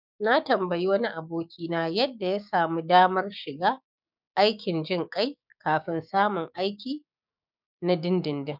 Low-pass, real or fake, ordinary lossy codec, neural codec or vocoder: 5.4 kHz; fake; none; codec, 16 kHz, 6 kbps, DAC